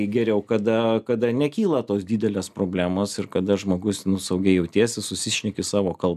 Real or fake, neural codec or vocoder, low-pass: real; none; 14.4 kHz